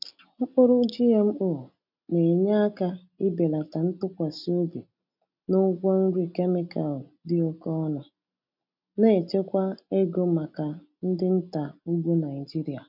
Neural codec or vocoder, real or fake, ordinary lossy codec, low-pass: none; real; none; 5.4 kHz